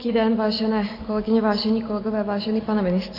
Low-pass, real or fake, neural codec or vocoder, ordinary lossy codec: 5.4 kHz; real; none; AAC, 24 kbps